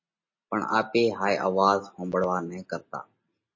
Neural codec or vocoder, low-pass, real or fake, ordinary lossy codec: none; 7.2 kHz; real; MP3, 32 kbps